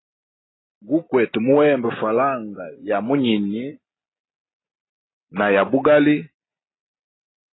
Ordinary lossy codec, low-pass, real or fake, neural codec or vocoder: AAC, 16 kbps; 7.2 kHz; real; none